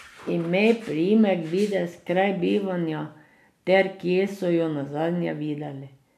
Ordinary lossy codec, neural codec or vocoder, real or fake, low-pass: none; none; real; 14.4 kHz